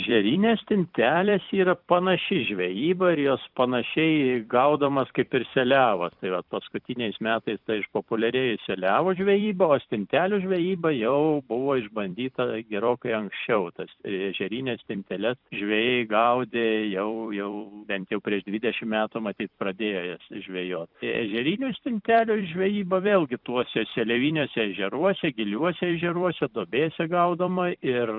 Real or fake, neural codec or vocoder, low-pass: fake; vocoder, 44.1 kHz, 128 mel bands every 256 samples, BigVGAN v2; 5.4 kHz